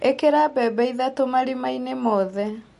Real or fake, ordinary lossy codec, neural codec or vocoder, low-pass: real; MP3, 48 kbps; none; 14.4 kHz